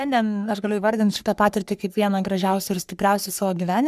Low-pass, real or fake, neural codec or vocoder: 14.4 kHz; fake; codec, 44.1 kHz, 3.4 kbps, Pupu-Codec